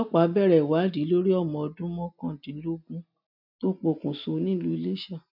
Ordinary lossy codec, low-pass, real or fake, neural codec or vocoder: none; 5.4 kHz; real; none